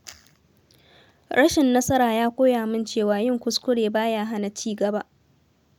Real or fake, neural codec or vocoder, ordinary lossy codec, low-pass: real; none; none; none